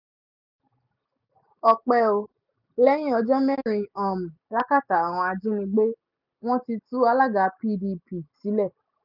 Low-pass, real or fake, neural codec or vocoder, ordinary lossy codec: 5.4 kHz; real; none; none